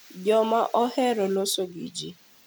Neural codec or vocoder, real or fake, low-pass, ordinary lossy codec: none; real; none; none